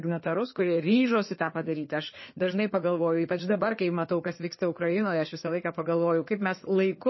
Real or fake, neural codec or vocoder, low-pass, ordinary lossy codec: fake; codec, 16 kHz in and 24 kHz out, 2.2 kbps, FireRedTTS-2 codec; 7.2 kHz; MP3, 24 kbps